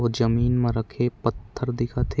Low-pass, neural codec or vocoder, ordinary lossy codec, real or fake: none; none; none; real